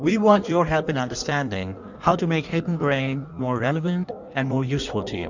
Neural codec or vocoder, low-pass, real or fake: codec, 16 kHz in and 24 kHz out, 1.1 kbps, FireRedTTS-2 codec; 7.2 kHz; fake